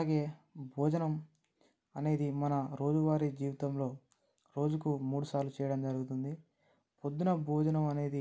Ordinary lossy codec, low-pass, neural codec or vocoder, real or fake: none; none; none; real